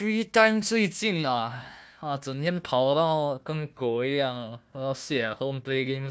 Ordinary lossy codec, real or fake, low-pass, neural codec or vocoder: none; fake; none; codec, 16 kHz, 1 kbps, FunCodec, trained on Chinese and English, 50 frames a second